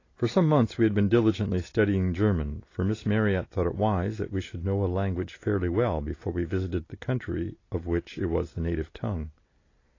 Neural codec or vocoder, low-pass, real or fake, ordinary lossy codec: none; 7.2 kHz; real; AAC, 32 kbps